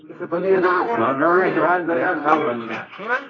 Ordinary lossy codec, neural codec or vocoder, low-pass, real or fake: AAC, 32 kbps; codec, 24 kHz, 0.9 kbps, WavTokenizer, medium music audio release; 7.2 kHz; fake